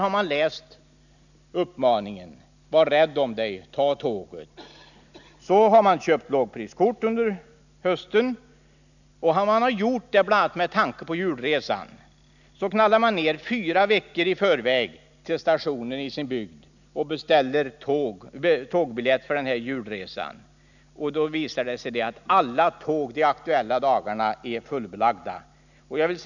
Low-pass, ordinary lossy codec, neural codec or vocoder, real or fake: 7.2 kHz; none; none; real